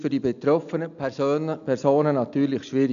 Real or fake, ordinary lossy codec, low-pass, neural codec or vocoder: real; none; 7.2 kHz; none